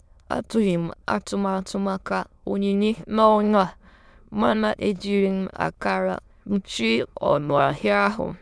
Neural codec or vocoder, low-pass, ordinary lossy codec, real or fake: autoencoder, 22.05 kHz, a latent of 192 numbers a frame, VITS, trained on many speakers; none; none; fake